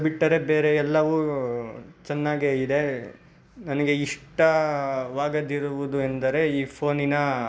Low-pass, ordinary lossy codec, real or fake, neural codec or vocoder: none; none; real; none